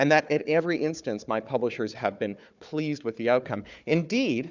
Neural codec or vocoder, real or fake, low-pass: codec, 16 kHz, 4 kbps, FunCodec, trained on Chinese and English, 50 frames a second; fake; 7.2 kHz